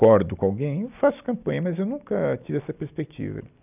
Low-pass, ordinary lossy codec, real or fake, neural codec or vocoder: 3.6 kHz; none; real; none